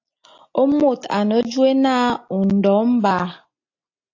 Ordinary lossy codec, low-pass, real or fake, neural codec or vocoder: AAC, 48 kbps; 7.2 kHz; real; none